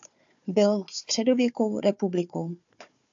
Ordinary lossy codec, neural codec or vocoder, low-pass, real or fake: AAC, 64 kbps; codec, 16 kHz, 4 kbps, FunCodec, trained on Chinese and English, 50 frames a second; 7.2 kHz; fake